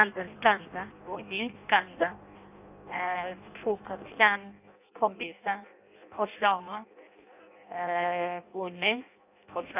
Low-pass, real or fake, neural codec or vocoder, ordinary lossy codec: 3.6 kHz; fake; codec, 16 kHz in and 24 kHz out, 0.6 kbps, FireRedTTS-2 codec; none